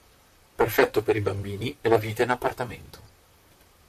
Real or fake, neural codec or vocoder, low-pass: fake; vocoder, 44.1 kHz, 128 mel bands, Pupu-Vocoder; 14.4 kHz